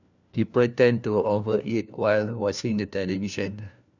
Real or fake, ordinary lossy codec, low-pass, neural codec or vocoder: fake; none; 7.2 kHz; codec, 16 kHz, 1 kbps, FunCodec, trained on LibriTTS, 50 frames a second